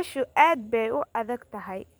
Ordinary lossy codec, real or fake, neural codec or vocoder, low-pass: none; fake; vocoder, 44.1 kHz, 128 mel bands every 512 samples, BigVGAN v2; none